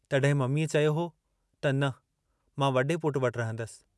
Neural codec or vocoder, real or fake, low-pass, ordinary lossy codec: none; real; none; none